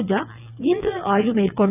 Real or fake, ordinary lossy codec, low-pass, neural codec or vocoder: fake; none; 3.6 kHz; vocoder, 22.05 kHz, 80 mel bands, WaveNeXt